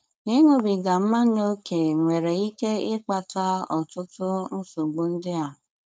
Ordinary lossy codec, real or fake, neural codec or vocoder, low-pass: none; fake; codec, 16 kHz, 4.8 kbps, FACodec; none